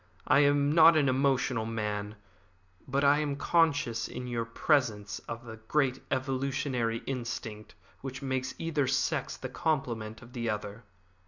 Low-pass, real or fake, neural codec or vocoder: 7.2 kHz; real; none